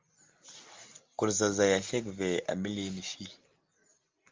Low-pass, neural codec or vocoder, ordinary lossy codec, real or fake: 7.2 kHz; none; Opus, 32 kbps; real